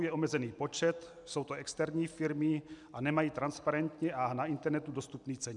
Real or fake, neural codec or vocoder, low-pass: real; none; 10.8 kHz